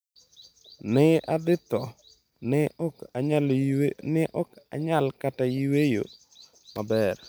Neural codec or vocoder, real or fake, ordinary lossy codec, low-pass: none; real; none; none